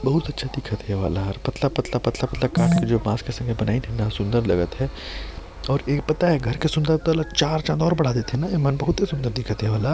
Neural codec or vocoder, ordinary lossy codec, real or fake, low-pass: none; none; real; none